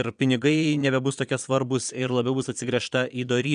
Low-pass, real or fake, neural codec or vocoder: 9.9 kHz; fake; vocoder, 22.05 kHz, 80 mel bands, Vocos